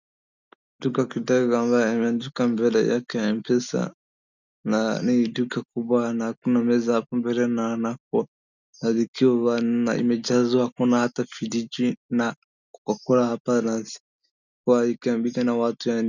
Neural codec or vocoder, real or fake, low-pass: none; real; 7.2 kHz